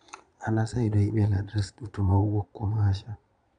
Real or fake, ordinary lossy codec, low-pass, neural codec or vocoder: fake; none; 9.9 kHz; vocoder, 22.05 kHz, 80 mel bands, Vocos